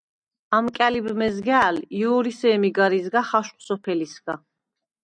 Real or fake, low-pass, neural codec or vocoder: real; 9.9 kHz; none